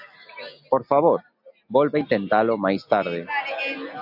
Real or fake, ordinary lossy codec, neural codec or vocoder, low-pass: real; AAC, 48 kbps; none; 5.4 kHz